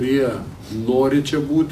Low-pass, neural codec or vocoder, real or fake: 14.4 kHz; none; real